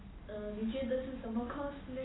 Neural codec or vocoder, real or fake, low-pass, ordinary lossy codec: none; real; 7.2 kHz; AAC, 16 kbps